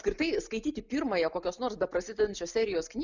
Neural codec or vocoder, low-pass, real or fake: none; 7.2 kHz; real